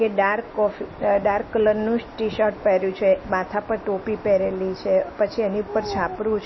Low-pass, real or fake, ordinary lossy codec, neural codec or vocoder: 7.2 kHz; real; MP3, 24 kbps; none